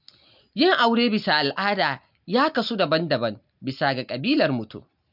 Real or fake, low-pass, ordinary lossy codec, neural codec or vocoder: real; 5.4 kHz; none; none